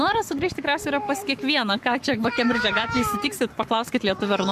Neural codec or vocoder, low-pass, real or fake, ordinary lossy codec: codec, 44.1 kHz, 7.8 kbps, Pupu-Codec; 14.4 kHz; fake; MP3, 96 kbps